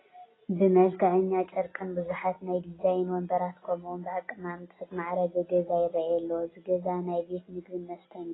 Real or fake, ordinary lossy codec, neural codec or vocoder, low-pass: real; AAC, 16 kbps; none; 7.2 kHz